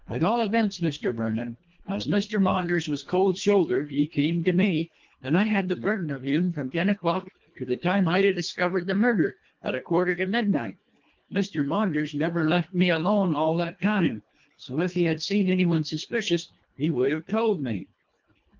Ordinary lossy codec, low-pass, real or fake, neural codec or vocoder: Opus, 32 kbps; 7.2 kHz; fake; codec, 24 kHz, 1.5 kbps, HILCodec